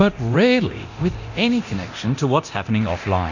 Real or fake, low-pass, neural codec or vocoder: fake; 7.2 kHz; codec, 24 kHz, 0.9 kbps, DualCodec